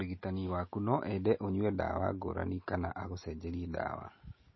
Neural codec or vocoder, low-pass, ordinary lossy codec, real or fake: none; 7.2 kHz; MP3, 24 kbps; real